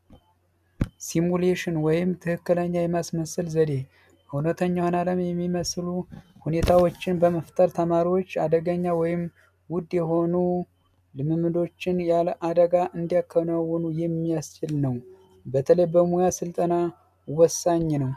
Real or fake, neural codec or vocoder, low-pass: real; none; 14.4 kHz